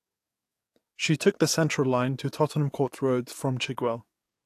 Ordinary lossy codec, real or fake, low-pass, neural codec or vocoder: AAC, 64 kbps; fake; 14.4 kHz; codec, 44.1 kHz, 7.8 kbps, DAC